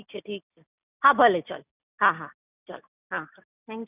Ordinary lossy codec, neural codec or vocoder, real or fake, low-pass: none; none; real; 3.6 kHz